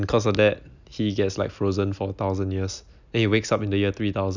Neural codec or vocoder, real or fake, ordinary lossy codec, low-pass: none; real; none; 7.2 kHz